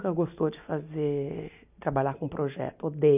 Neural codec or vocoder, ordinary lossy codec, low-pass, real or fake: none; none; 3.6 kHz; real